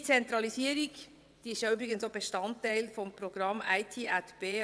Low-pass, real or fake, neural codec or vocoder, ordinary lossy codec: none; fake; vocoder, 22.05 kHz, 80 mel bands, WaveNeXt; none